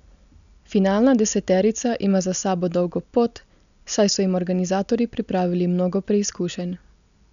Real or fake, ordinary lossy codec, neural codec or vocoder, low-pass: real; none; none; 7.2 kHz